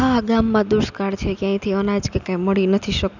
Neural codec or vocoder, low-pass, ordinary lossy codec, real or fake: none; 7.2 kHz; none; real